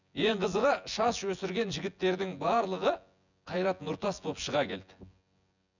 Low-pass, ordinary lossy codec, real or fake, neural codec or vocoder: 7.2 kHz; none; fake; vocoder, 24 kHz, 100 mel bands, Vocos